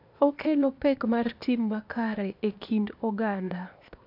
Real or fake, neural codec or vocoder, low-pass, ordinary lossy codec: fake; codec, 16 kHz, 0.8 kbps, ZipCodec; 5.4 kHz; none